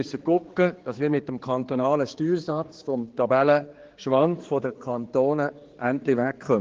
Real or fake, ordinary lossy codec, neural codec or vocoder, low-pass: fake; Opus, 16 kbps; codec, 16 kHz, 4 kbps, X-Codec, HuBERT features, trained on general audio; 7.2 kHz